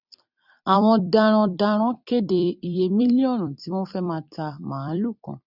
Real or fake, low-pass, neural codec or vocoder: fake; 5.4 kHz; vocoder, 44.1 kHz, 128 mel bands every 256 samples, BigVGAN v2